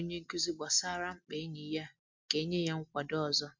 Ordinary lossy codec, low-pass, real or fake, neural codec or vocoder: MP3, 64 kbps; 7.2 kHz; real; none